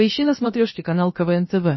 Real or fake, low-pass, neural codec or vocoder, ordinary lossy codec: fake; 7.2 kHz; codec, 16 kHz, about 1 kbps, DyCAST, with the encoder's durations; MP3, 24 kbps